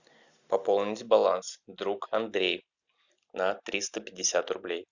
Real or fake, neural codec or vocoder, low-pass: real; none; 7.2 kHz